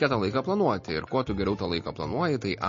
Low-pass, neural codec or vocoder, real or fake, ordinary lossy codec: 7.2 kHz; none; real; MP3, 32 kbps